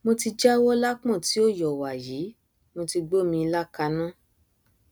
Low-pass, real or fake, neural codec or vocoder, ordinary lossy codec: none; real; none; none